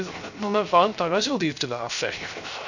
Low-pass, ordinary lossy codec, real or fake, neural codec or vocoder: 7.2 kHz; none; fake; codec, 16 kHz, 0.3 kbps, FocalCodec